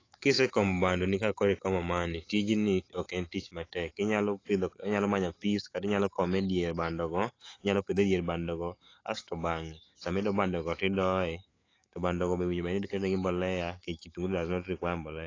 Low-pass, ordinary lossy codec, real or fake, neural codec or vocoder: 7.2 kHz; AAC, 32 kbps; fake; autoencoder, 48 kHz, 128 numbers a frame, DAC-VAE, trained on Japanese speech